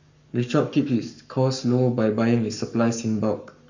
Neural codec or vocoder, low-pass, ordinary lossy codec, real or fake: codec, 44.1 kHz, 7.8 kbps, Pupu-Codec; 7.2 kHz; none; fake